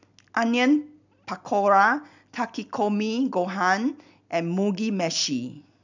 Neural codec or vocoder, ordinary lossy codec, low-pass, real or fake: none; none; 7.2 kHz; real